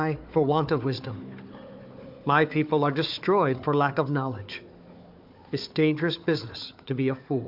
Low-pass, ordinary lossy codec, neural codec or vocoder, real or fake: 5.4 kHz; AAC, 48 kbps; codec, 16 kHz, 4 kbps, FunCodec, trained on Chinese and English, 50 frames a second; fake